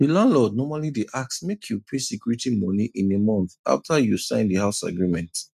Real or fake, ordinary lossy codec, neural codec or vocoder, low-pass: real; none; none; 14.4 kHz